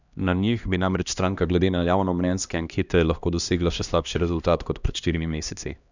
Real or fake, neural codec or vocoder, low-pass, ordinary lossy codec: fake; codec, 16 kHz, 1 kbps, X-Codec, HuBERT features, trained on LibriSpeech; 7.2 kHz; none